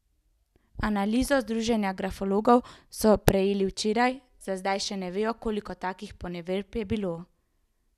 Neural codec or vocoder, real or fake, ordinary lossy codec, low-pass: none; real; none; 14.4 kHz